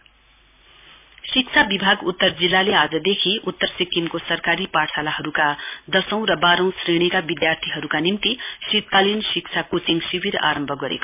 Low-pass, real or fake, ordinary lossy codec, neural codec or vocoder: 3.6 kHz; real; MP3, 32 kbps; none